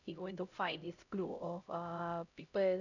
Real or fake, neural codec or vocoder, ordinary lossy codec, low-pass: fake; codec, 16 kHz, 0.5 kbps, X-Codec, HuBERT features, trained on LibriSpeech; none; 7.2 kHz